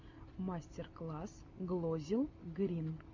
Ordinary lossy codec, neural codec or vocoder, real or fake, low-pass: MP3, 48 kbps; none; real; 7.2 kHz